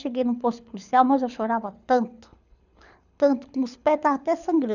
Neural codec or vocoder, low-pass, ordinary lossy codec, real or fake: codec, 24 kHz, 6 kbps, HILCodec; 7.2 kHz; none; fake